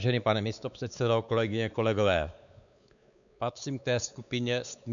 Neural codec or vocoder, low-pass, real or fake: codec, 16 kHz, 4 kbps, X-Codec, WavLM features, trained on Multilingual LibriSpeech; 7.2 kHz; fake